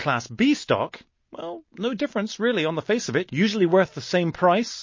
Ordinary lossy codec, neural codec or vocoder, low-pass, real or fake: MP3, 32 kbps; none; 7.2 kHz; real